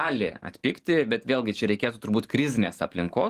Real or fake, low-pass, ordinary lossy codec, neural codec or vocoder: fake; 14.4 kHz; Opus, 24 kbps; codec, 44.1 kHz, 7.8 kbps, DAC